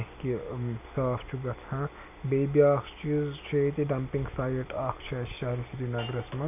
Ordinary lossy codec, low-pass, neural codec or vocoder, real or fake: none; 3.6 kHz; none; real